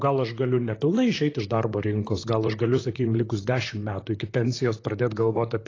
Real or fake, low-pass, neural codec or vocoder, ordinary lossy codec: fake; 7.2 kHz; vocoder, 44.1 kHz, 128 mel bands, Pupu-Vocoder; AAC, 32 kbps